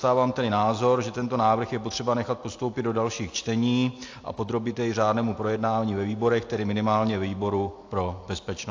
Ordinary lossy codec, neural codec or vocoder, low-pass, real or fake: AAC, 48 kbps; none; 7.2 kHz; real